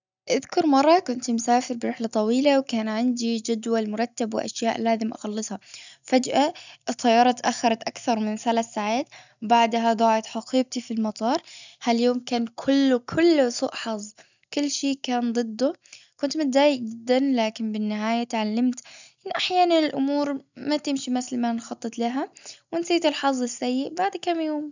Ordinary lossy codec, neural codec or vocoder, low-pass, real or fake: none; none; 7.2 kHz; real